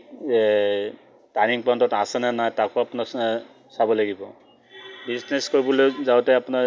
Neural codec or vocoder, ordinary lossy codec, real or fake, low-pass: none; none; real; none